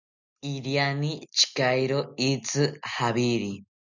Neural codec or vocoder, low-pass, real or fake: none; 7.2 kHz; real